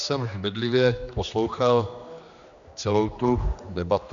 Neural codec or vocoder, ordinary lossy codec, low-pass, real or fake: codec, 16 kHz, 2 kbps, X-Codec, HuBERT features, trained on general audio; MP3, 96 kbps; 7.2 kHz; fake